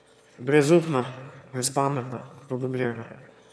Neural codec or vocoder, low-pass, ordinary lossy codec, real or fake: autoencoder, 22.05 kHz, a latent of 192 numbers a frame, VITS, trained on one speaker; none; none; fake